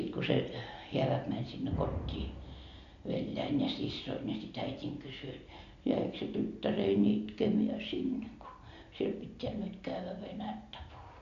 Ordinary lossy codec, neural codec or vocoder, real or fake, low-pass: MP3, 48 kbps; none; real; 7.2 kHz